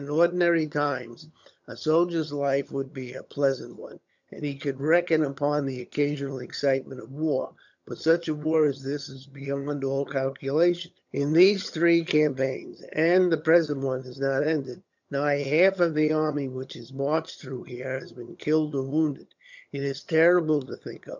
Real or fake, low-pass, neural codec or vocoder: fake; 7.2 kHz; vocoder, 22.05 kHz, 80 mel bands, HiFi-GAN